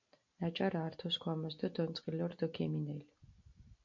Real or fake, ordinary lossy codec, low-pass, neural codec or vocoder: real; MP3, 48 kbps; 7.2 kHz; none